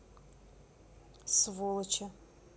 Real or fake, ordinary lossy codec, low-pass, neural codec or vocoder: real; none; none; none